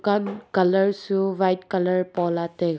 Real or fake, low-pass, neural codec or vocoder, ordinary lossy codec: real; none; none; none